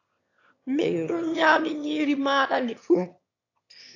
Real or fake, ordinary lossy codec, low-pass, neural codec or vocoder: fake; AAC, 48 kbps; 7.2 kHz; autoencoder, 22.05 kHz, a latent of 192 numbers a frame, VITS, trained on one speaker